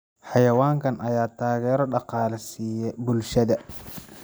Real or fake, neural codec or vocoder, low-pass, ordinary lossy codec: fake; vocoder, 44.1 kHz, 128 mel bands every 256 samples, BigVGAN v2; none; none